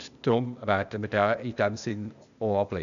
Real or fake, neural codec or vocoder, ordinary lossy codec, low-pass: fake; codec, 16 kHz, 0.8 kbps, ZipCodec; MP3, 96 kbps; 7.2 kHz